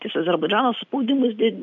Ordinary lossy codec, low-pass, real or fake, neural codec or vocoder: MP3, 64 kbps; 7.2 kHz; real; none